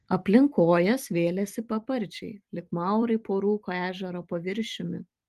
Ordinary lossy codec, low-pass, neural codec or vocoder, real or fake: Opus, 24 kbps; 14.4 kHz; vocoder, 44.1 kHz, 128 mel bands every 256 samples, BigVGAN v2; fake